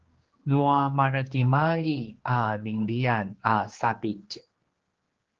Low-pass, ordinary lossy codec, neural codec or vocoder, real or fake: 7.2 kHz; Opus, 16 kbps; codec, 16 kHz, 2 kbps, X-Codec, HuBERT features, trained on general audio; fake